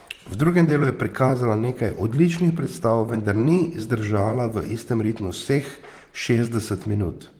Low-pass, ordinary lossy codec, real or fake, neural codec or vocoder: 19.8 kHz; Opus, 24 kbps; fake; vocoder, 44.1 kHz, 128 mel bands, Pupu-Vocoder